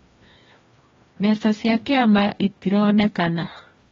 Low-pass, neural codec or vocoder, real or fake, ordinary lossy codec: 7.2 kHz; codec, 16 kHz, 1 kbps, FreqCodec, larger model; fake; AAC, 24 kbps